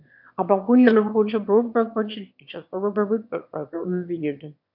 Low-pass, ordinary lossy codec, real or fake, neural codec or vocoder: 5.4 kHz; AAC, 48 kbps; fake; autoencoder, 22.05 kHz, a latent of 192 numbers a frame, VITS, trained on one speaker